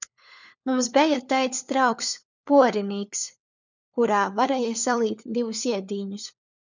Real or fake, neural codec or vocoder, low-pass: fake; codec, 16 kHz, 4 kbps, FunCodec, trained on LibriTTS, 50 frames a second; 7.2 kHz